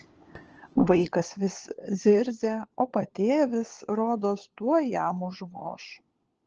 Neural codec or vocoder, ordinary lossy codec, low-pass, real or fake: codec, 16 kHz, 4 kbps, FunCodec, trained on LibriTTS, 50 frames a second; Opus, 16 kbps; 7.2 kHz; fake